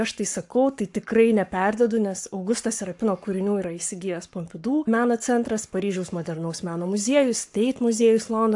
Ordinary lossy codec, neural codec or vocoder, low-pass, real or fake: AAC, 64 kbps; codec, 44.1 kHz, 7.8 kbps, Pupu-Codec; 10.8 kHz; fake